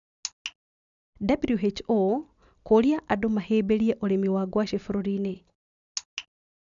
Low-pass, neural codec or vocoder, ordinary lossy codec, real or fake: 7.2 kHz; none; none; real